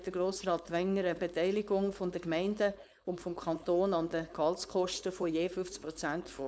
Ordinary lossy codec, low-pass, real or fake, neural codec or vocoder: none; none; fake; codec, 16 kHz, 4.8 kbps, FACodec